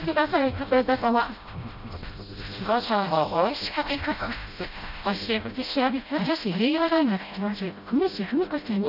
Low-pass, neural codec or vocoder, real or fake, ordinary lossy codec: 5.4 kHz; codec, 16 kHz, 0.5 kbps, FreqCodec, smaller model; fake; none